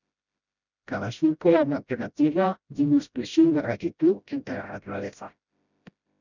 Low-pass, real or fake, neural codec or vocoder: 7.2 kHz; fake; codec, 16 kHz, 0.5 kbps, FreqCodec, smaller model